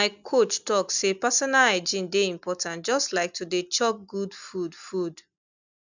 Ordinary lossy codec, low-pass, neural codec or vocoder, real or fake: none; 7.2 kHz; none; real